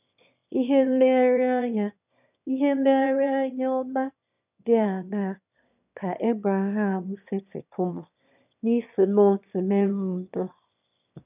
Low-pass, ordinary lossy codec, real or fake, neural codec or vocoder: 3.6 kHz; none; fake; autoencoder, 22.05 kHz, a latent of 192 numbers a frame, VITS, trained on one speaker